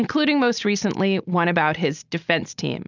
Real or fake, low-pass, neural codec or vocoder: real; 7.2 kHz; none